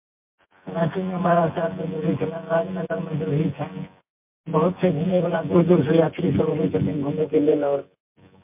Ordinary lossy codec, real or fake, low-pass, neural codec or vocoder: MP3, 32 kbps; fake; 3.6 kHz; vocoder, 24 kHz, 100 mel bands, Vocos